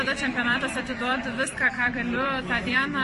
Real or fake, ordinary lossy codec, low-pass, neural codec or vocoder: fake; MP3, 48 kbps; 14.4 kHz; vocoder, 44.1 kHz, 128 mel bands every 256 samples, BigVGAN v2